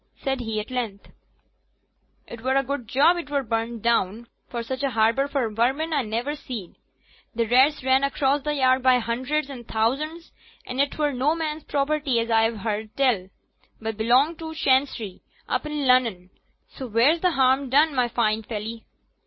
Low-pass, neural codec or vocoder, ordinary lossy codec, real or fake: 7.2 kHz; none; MP3, 24 kbps; real